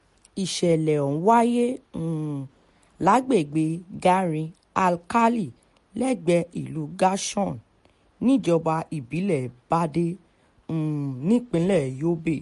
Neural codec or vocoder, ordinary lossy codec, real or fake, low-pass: none; MP3, 48 kbps; real; 14.4 kHz